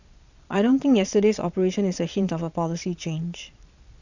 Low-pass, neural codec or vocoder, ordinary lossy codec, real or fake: 7.2 kHz; vocoder, 22.05 kHz, 80 mel bands, Vocos; none; fake